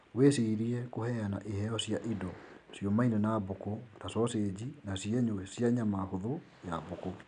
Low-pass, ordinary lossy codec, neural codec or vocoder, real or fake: 9.9 kHz; none; none; real